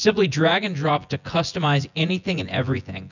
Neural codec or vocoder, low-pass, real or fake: vocoder, 24 kHz, 100 mel bands, Vocos; 7.2 kHz; fake